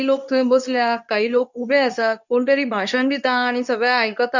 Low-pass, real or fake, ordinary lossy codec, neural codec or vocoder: 7.2 kHz; fake; none; codec, 24 kHz, 0.9 kbps, WavTokenizer, medium speech release version 2